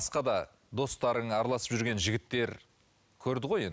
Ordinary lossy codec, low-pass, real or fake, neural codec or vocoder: none; none; real; none